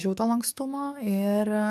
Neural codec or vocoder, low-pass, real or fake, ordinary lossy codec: codec, 44.1 kHz, 7.8 kbps, DAC; 14.4 kHz; fake; AAC, 96 kbps